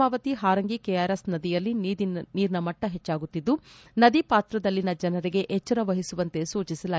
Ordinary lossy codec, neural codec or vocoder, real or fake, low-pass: none; none; real; none